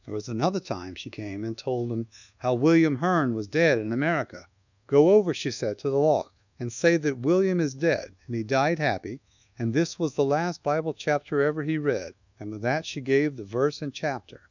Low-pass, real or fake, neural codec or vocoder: 7.2 kHz; fake; codec, 24 kHz, 1.2 kbps, DualCodec